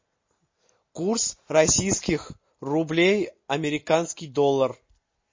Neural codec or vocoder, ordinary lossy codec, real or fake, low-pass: none; MP3, 32 kbps; real; 7.2 kHz